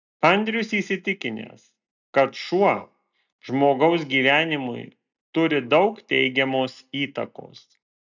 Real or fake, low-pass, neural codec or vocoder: real; 7.2 kHz; none